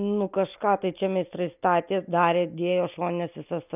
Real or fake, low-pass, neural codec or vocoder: real; 3.6 kHz; none